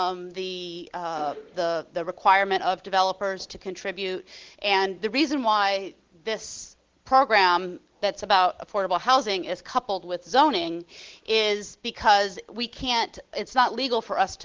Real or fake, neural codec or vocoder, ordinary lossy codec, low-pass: real; none; Opus, 16 kbps; 7.2 kHz